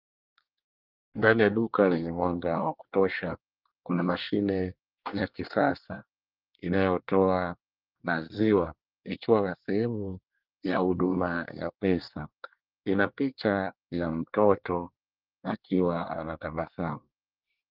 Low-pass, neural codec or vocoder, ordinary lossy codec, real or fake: 5.4 kHz; codec, 24 kHz, 1 kbps, SNAC; Opus, 24 kbps; fake